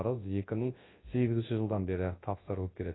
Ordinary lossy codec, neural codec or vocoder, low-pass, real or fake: AAC, 16 kbps; codec, 24 kHz, 0.9 kbps, WavTokenizer, large speech release; 7.2 kHz; fake